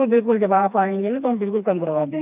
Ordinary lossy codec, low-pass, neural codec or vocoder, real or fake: AAC, 32 kbps; 3.6 kHz; codec, 16 kHz, 2 kbps, FreqCodec, smaller model; fake